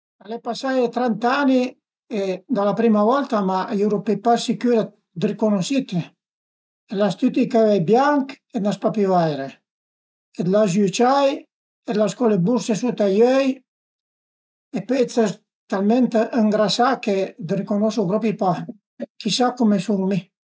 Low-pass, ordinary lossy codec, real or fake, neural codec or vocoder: none; none; real; none